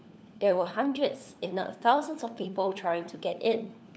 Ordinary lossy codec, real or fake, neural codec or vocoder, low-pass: none; fake; codec, 16 kHz, 4 kbps, FunCodec, trained on LibriTTS, 50 frames a second; none